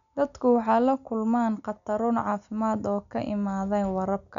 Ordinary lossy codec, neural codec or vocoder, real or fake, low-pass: none; none; real; 7.2 kHz